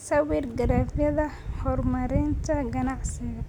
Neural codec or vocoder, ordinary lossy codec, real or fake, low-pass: none; none; real; 19.8 kHz